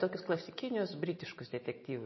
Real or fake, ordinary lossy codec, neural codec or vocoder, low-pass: real; MP3, 24 kbps; none; 7.2 kHz